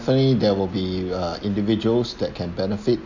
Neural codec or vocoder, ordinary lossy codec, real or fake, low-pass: none; none; real; 7.2 kHz